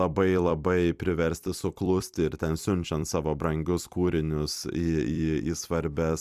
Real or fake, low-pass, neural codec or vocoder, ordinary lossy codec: fake; 14.4 kHz; vocoder, 44.1 kHz, 128 mel bands every 256 samples, BigVGAN v2; Opus, 64 kbps